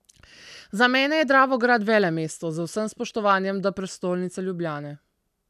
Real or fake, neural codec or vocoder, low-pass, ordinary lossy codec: real; none; 14.4 kHz; none